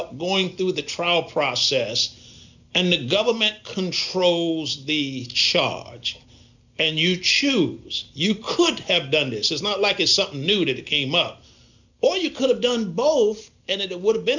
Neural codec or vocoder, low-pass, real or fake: none; 7.2 kHz; real